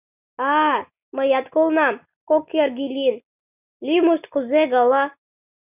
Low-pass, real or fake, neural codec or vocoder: 3.6 kHz; real; none